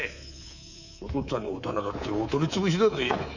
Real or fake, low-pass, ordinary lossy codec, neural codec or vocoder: fake; 7.2 kHz; none; codec, 24 kHz, 3.1 kbps, DualCodec